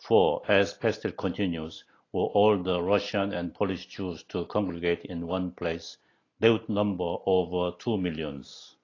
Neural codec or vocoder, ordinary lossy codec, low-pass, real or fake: none; AAC, 32 kbps; 7.2 kHz; real